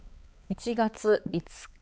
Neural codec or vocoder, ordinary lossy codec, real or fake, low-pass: codec, 16 kHz, 4 kbps, X-Codec, HuBERT features, trained on balanced general audio; none; fake; none